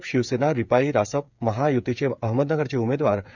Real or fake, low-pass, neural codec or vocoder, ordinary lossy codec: fake; 7.2 kHz; codec, 16 kHz, 8 kbps, FreqCodec, smaller model; none